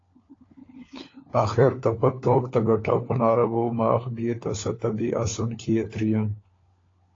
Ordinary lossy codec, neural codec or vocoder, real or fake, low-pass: AAC, 32 kbps; codec, 16 kHz, 4 kbps, FunCodec, trained on LibriTTS, 50 frames a second; fake; 7.2 kHz